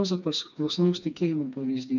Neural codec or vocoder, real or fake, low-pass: codec, 16 kHz, 2 kbps, FreqCodec, smaller model; fake; 7.2 kHz